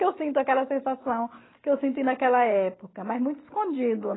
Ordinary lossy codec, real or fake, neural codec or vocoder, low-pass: AAC, 16 kbps; real; none; 7.2 kHz